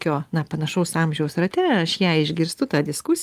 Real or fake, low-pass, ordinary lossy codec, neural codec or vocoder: real; 14.4 kHz; Opus, 32 kbps; none